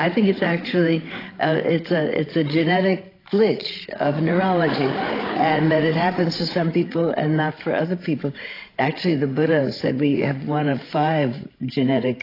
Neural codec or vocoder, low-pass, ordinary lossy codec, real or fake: codec, 16 kHz, 16 kbps, FreqCodec, larger model; 5.4 kHz; AAC, 24 kbps; fake